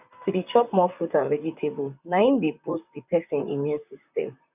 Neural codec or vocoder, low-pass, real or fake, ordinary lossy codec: vocoder, 44.1 kHz, 128 mel bands, Pupu-Vocoder; 3.6 kHz; fake; none